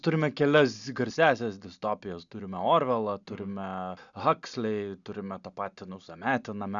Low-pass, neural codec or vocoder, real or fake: 7.2 kHz; none; real